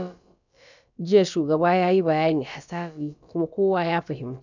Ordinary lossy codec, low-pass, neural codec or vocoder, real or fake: none; 7.2 kHz; codec, 16 kHz, about 1 kbps, DyCAST, with the encoder's durations; fake